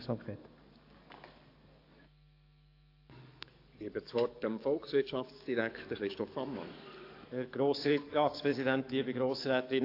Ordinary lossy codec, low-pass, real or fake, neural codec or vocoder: AAC, 32 kbps; 5.4 kHz; fake; codec, 44.1 kHz, 7.8 kbps, DAC